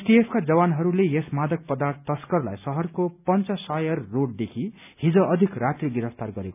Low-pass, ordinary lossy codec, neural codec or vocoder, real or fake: 3.6 kHz; none; none; real